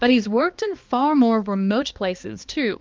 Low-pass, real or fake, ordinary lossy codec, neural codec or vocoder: 7.2 kHz; fake; Opus, 32 kbps; codec, 16 kHz, 2 kbps, X-Codec, HuBERT features, trained on balanced general audio